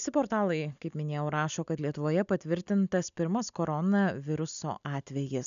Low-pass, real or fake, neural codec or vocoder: 7.2 kHz; real; none